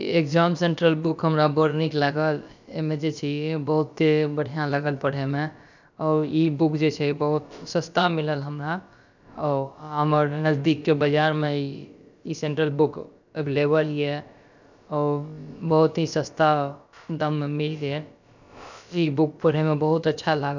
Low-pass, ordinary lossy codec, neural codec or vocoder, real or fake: 7.2 kHz; none; codec, 16 kHz, about 1 kbps, DyCAST, with the encoder's durations; fake